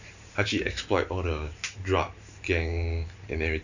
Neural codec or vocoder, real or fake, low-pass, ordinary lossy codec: vocoder, 44.1 kHz, 128 mel bands every 512 samples, BigVGAN v2; fake; 7.2 kHz; none